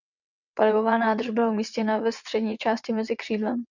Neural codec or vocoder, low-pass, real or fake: vocoder, 22.05 kHz, 80 mel bands, WaveNeXt; 7.2 kHz; fake